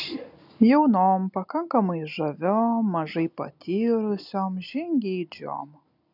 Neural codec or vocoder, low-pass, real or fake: none; 5.4 kHz; real